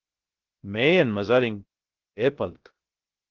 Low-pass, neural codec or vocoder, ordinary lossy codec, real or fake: 7.2 kHz; codec, 16 kHz, 0.3 kbps, FocalCodec; Opus, 16 kbps; fake